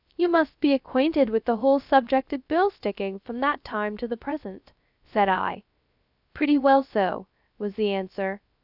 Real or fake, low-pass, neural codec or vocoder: fake; 5.4 kHz; codec, 16 kHz, 0.3 kbps, FocalCodec